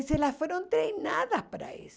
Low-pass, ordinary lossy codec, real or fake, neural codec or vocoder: none; none; real; none